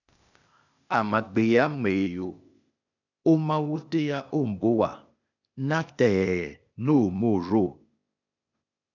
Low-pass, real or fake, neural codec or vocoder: 7.2 kHz; fake; codec, 16 kHz, 0.8 kbps, ZipCodec